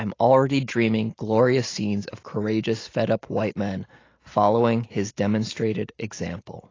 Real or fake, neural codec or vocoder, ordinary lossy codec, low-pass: real; none; AAC, 32 kbps; 7.2 kHz